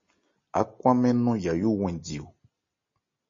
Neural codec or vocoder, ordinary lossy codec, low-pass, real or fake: none; MP3, 32 kbps; 7.2 kHz; real